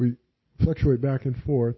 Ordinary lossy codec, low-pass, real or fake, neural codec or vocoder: MP3, 24 kbps; 7.2 kHz; real; none